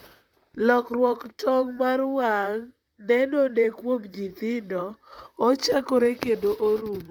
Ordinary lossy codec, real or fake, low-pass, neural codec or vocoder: none; fake; 19.8 kHz; vocoder, 44.1 kHz, 128 mel bands, Pupu-Vocoder